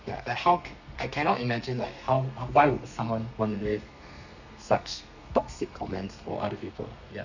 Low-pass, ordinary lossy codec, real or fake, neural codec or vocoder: 7.2 kHz; none; fake; codec, 32 kHz, 1.9 kbps, SNAC